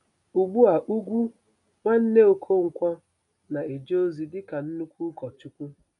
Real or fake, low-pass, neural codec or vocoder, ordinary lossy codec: real; 10.8 kHz; none; none